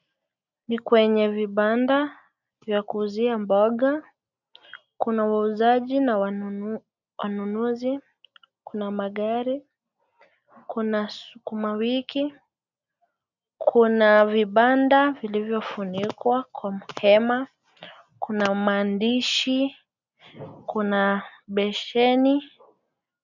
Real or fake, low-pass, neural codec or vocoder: real; 7.2 kHz; none